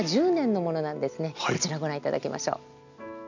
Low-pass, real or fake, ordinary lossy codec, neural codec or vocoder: 7.2 kHz; real; none; none